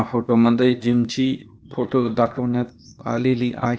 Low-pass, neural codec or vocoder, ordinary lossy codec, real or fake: none; codec, 16 kHz, 0.8 kbps, ZipCodec; none; fake